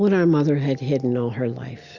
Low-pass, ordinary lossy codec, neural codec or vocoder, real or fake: 7.2 kHz; Opus, 64 kbps; none; real